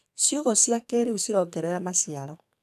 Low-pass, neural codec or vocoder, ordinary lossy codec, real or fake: 14.4 kHz; codec, 44.1 kHz, 2.6 kbps, SNAC; none; fake